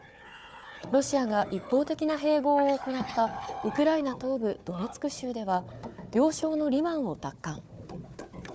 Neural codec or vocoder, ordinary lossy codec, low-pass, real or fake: codec, 16 kHz, 4 kbps, FunCodec, trained on Chinese and English, 50 frames a second; none; none; fake